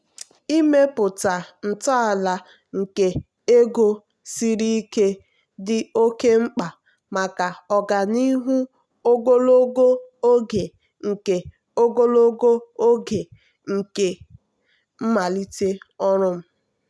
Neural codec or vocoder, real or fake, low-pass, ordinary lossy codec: none; real; none; none